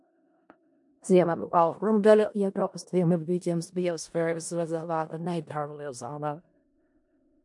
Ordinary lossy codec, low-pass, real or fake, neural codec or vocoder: MP3, 64 kbps; 10.8 kHz; fake; codec, 16 kHz in and 24 kHz out, 0.4 kbps, LongCat-Audio-Codec, four codebook decoder